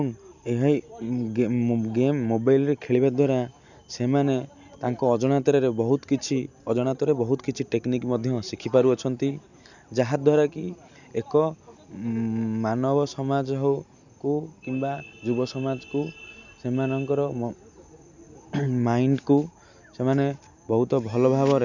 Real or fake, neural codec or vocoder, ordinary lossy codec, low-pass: real; none; none; 7.2 kHz